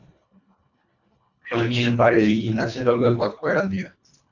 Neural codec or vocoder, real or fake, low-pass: codec, 24 kHz, 1.5 kbps, HILCodec; fake; 7.2 kHz